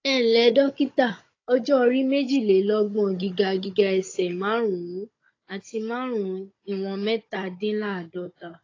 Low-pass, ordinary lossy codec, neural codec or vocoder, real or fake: 7.2 kHz; AAC, 32 kbps; codec, 16 kHz, 16 kbps, FunCodec, trained on Chinese and English, 50 frames a second; fake